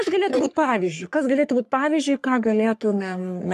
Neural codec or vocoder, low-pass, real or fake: codec, 44.1 kHz, 3.4 kbps, Pupu-Codec; 14.4 kHz; fake